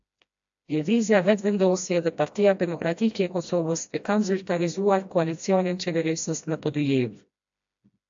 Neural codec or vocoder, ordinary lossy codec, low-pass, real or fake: codec, 16 kHz, 1 kbps, FreqCodec, smaller model; AAC, 64 kbps; 7.2 kHz; fake